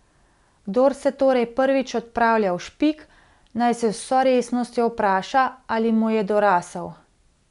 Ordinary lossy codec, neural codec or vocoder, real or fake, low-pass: none; none; real; 10.8 kHz